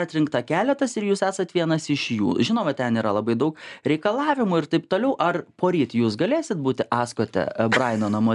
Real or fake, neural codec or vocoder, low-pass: real; none; 10.8 kHz